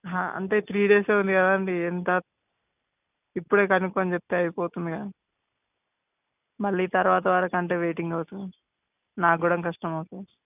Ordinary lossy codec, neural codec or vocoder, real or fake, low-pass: none; none; real; 3.6 kHz